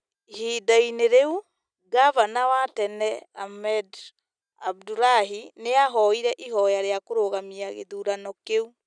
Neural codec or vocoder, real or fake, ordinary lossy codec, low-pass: none; real; none; 9.9 kHz